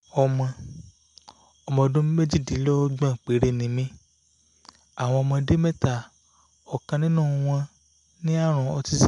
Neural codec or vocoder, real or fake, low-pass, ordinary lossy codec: none; real; 10.8 kHz; none